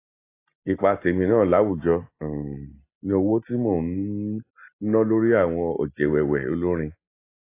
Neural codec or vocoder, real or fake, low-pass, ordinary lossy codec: none; real; 3.6 kHz; AAC, 24 kbps